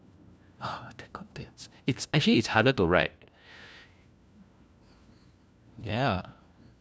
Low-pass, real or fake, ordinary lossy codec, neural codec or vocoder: none; fake; none; codec, 16 kHz, 1 kbps, FunCodec, trained on LibriTTS, 50 frames a second